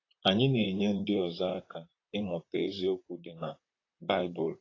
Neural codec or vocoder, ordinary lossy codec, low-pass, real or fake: vocoder, 44.1 kHz, 128 mel bands, Pupu-Vocoder; AAC, 32 kbps; 7.2 kHz; fake